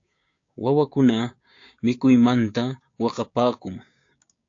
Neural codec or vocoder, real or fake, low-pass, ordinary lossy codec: codec, 16 kHz, 6 kbps, DAC; fake; 7.2 kHz; AAC, 48 kbps